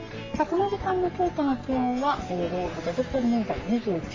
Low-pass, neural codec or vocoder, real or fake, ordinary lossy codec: 7.2 kHz; codec, 44.1 kHz, 3.4 kbps, Pupu-Codec; fake; MP3, 48 kbps